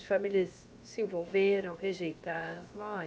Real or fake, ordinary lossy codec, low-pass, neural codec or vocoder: fake; none; none; codec, 16 kHz, about 1 kbps, DyCAST, with the encoder's durations